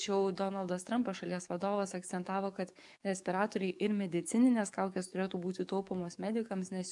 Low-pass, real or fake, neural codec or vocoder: 10.8 kHz; fake; codec, 44.1 kHz, 7.8 kbps, DAC